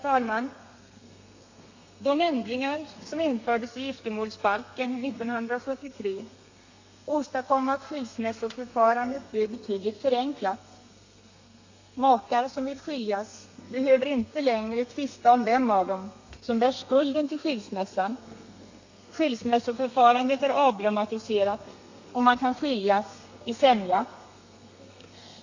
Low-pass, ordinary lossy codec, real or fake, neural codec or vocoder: 7.2 kHz; AAC, 48 kbps; fake; codec, 32 kHz, 1.9 kbps, SNAC